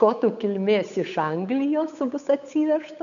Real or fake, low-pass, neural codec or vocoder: fake; 7.2 kHz; codec, 16 kHz, 8 kbps, FunCodec, trained on Chinese and English, 25 frames a second